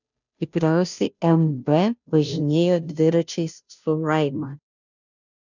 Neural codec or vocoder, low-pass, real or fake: codec, 16 kHz, 0.5 kbps, FunCodec, trained on Chinese and English, 25 frames a second; 7.2 kHz; fake